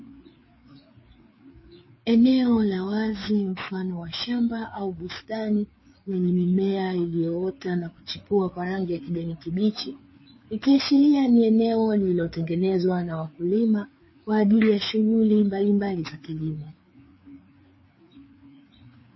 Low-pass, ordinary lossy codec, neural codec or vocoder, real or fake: 7.2 kHz; MP3, 24 kbps; codec, 16 kHz, 4 kbps, FreqCodec, larger model; fake